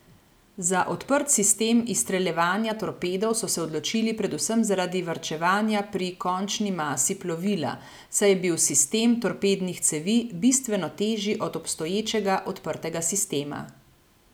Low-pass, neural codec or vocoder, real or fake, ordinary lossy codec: none; none; real; none